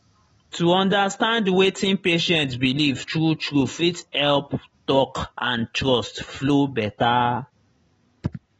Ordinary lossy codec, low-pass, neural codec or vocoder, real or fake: AAC, 24 kbps; 19.8 kHz; none; real